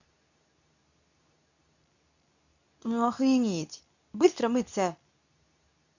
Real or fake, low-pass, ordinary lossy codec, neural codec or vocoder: fake; 7.2 kHz; none; codec, 24 kHz, 0.9 kbps, WavTokenizer, medium speech release version 2